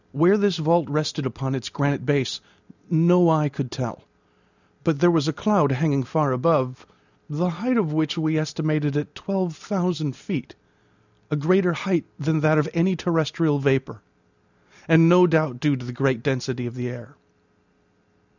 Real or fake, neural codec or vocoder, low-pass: real; none; 7.2 kHz